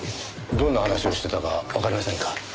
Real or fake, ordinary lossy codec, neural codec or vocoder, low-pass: real; none; none; none